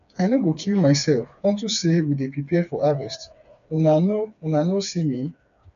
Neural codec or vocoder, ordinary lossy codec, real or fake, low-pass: codec, 16 kHz, 4 kbps, FreqCodec, smaller model; none; fake; 7.2 kHz